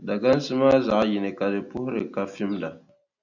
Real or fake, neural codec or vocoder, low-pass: real; none; 7.2 kHz